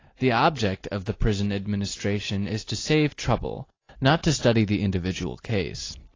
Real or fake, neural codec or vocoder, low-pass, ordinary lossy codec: real; none; 7.2 kHz; AAC, 32 kbps